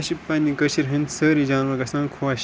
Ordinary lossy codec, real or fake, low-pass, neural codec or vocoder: none; real; none; none